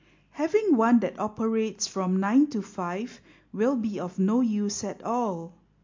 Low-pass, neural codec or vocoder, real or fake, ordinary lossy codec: 7.2 kHz; none; real; MP3, 48 kbps